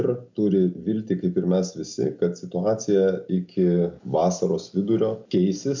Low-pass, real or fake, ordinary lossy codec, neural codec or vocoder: 7.2 kHz; real; MP3, 64 kbps; none